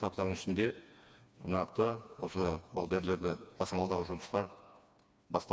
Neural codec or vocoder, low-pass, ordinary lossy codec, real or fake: codec, 16 kHz, 2 kbps, FreqCodec, smaller model; none; none; fake